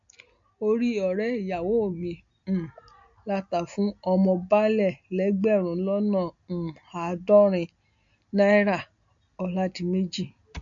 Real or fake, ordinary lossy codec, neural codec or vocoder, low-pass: real; MP3, 48 kbps; none; 7.2 kHz